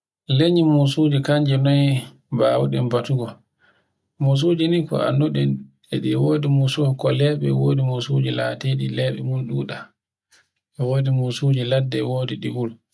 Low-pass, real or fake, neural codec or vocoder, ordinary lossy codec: none; real; none; none